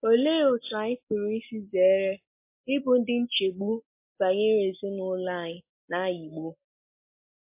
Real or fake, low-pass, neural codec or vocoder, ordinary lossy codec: real; 3.6 kHz; none; MP3, 24 kbps